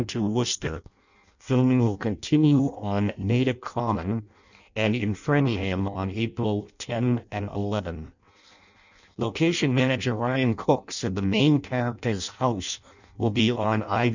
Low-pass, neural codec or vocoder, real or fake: 7.2 kHz; codec, 16 kHz in and 24 kHz out, 0.6 kbps, FireRedTTS-2 codec; fake